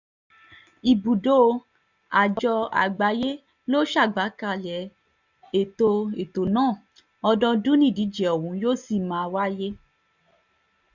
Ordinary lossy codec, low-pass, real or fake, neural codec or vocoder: none; 7.2 kHz; real; none